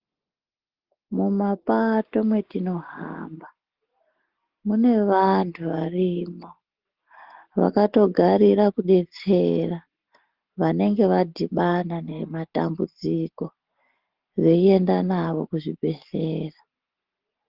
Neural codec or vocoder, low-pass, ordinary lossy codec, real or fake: none; 5.4 kHz; Opus, 16 kbps; real